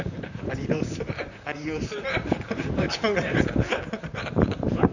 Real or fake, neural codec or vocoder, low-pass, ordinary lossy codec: fake; vocoder, 44.1 kHz, 128 mel bands, Pupu-Vocoder; 7.2 kHz; none